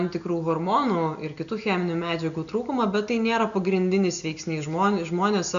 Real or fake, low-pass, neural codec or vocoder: real; 7.2 kHz; none